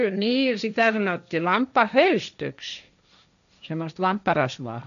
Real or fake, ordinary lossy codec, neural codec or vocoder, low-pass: fake; none; codec, 16 kHz, 1.1 kbps, Voila-Tokenizer; 7.2 kHz